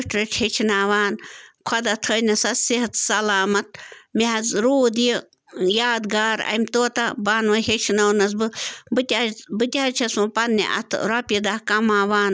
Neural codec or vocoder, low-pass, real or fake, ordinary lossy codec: none; none; real; none